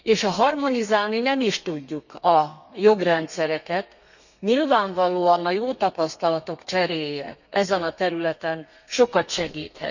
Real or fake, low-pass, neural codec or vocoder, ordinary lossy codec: fake; 7.2 kHz; codec, 32 kHz, 1.9 kbps, SNAC; none